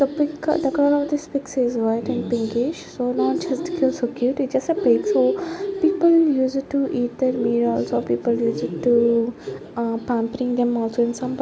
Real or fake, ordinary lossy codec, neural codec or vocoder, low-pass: real; none; none; none